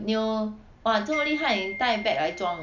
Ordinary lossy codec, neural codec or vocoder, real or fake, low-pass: none; none; real; 7.2 kHz